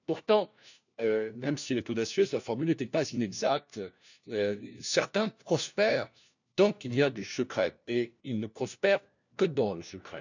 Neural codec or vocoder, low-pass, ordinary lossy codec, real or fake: codec, 16 kHz, 1 kbps, FunCodec, trained on LibriTTS, 50 frames a second; 7.2 kHz; none; fake